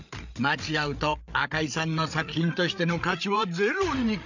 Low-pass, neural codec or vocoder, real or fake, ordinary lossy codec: 7.2 kHz; codec, 16 kHz, 8 kbps, FreqCodec, larger model; fake; none